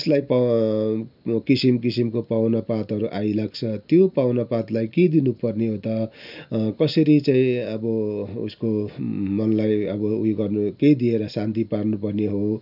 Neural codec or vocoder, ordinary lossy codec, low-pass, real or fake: none; none; 5.4 kHz; real